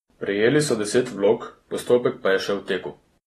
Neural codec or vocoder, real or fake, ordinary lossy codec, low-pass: none; real; AAC, 32 kbps; 19.8 kHz